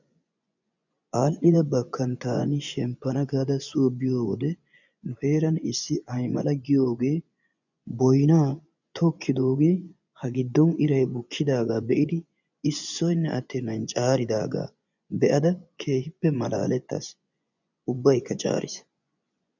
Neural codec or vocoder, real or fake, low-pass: vocoder, 24 kHz, 100 mel bands, Vocos; fake; 7.2 kHz